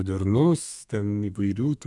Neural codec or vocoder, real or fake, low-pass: codec, 32 kHz, 1.9 kbps, SNAC; fake; 10.8 kHz